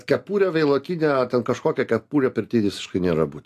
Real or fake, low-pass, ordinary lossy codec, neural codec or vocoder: real; 14.4 kHz; AAC, 64 kbps; none